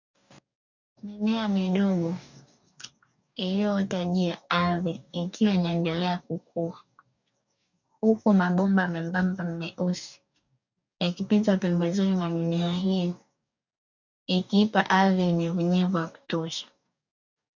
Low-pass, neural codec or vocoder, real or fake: 7.2 kHz; codec, 44.1 kHz, 2.6 kbps, DAC; fake